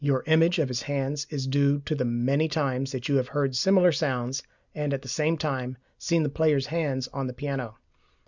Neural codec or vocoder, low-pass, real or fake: none; 7.2 kHz; real